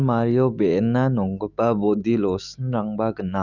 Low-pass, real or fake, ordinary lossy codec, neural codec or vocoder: 7.2 kHz; fake; none; autoencoder, 48 kHz, 128 numbers a frame, DAC-VAE, trained on Japanese speech